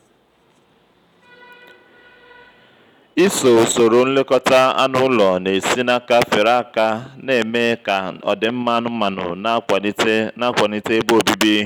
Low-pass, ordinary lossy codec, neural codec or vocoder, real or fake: 19.8 kHz; none; none; real